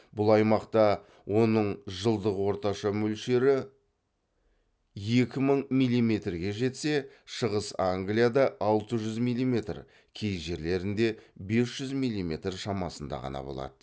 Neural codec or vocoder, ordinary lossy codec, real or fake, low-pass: none; none; real; none